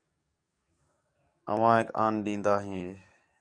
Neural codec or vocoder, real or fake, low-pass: codec, 44.1 kHz, 7.8 kbps, DAC; fake; 9.9 kHz